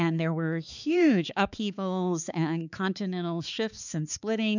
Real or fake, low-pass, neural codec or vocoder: fake; 7.2 kHz; codec, 16 kHz, 4 kbps, X-Codec, HuBERT features, trained on balanced general audio